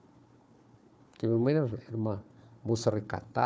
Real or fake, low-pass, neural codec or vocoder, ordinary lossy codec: fake; none; codec, 16 kHz, 4 kbps, FunCodec, trained on Chinese and English, 50 frames a second; none